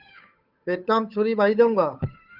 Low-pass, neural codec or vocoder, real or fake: 5.4 kHz; codec, 16 kHz, 8 kbps, FunCodec, trained on Chinese and English, 25 frames a second; fake